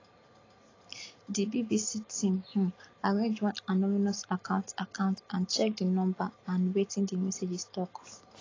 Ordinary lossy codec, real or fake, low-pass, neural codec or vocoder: AAC, 32 kbps; real; 7.2 kHz; none